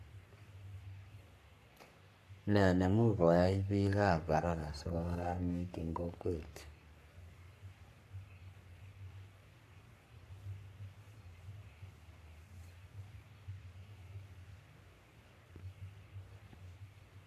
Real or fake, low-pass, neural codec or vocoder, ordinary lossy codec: fake; 14.4 kHz; codec, 44.1 kHz, 3.4 kbps, Pupu-Codec; AAC, 64 kbps